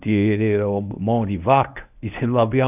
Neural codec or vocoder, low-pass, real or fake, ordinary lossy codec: codec, 24 kHz, 0.9 kbps, WavTokenizer, medium speech release version 1; 3.6 kHz; fake; none